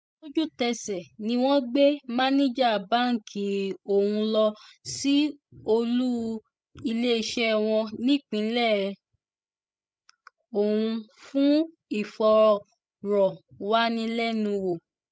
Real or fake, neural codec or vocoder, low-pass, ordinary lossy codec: fake; codec, 16 kHz, 16 kbps, FreqCodec, larger model; none; none